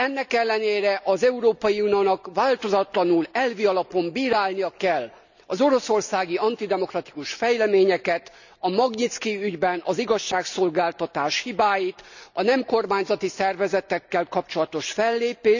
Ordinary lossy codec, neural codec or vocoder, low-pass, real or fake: none; none; 7.2 kHz; real